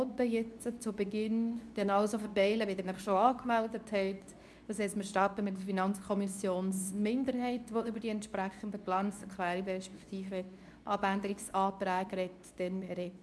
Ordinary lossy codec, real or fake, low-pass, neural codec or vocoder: none; fake; none; codec, 24 kHz, 0.9 kbps, WavTokenizer, medium speech release version 2